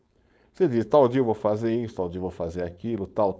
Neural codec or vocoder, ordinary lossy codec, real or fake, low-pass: codec, 16 kHz, 4.8 kbps, FACodec; none; fake; none